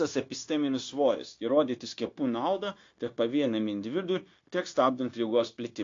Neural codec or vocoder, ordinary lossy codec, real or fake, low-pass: codec, 16 kHz, 0.9 kbps, LongCat-Audio-Codec; AAC, 48 kbps; fake; 7.2 kHz